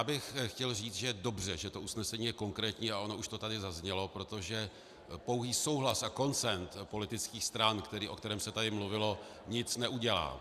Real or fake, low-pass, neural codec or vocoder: real; 14.4 kHz; none